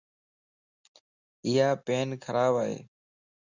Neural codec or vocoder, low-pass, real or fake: none; 7.2 kHz; real